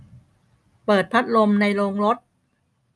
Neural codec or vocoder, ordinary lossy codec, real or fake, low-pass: none; none; real; none